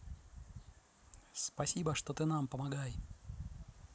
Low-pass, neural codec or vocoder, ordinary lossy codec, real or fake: none; none; none; real